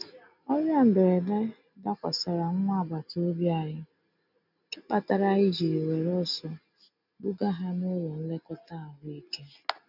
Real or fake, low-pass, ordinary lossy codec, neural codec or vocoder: real; 5.4 kHz; none; none